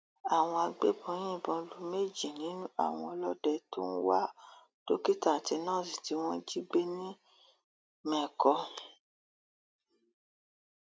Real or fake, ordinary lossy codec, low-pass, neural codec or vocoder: real; none; none; none